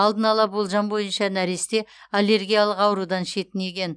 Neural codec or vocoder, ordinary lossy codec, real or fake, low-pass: none; none; real; 9.9 kHz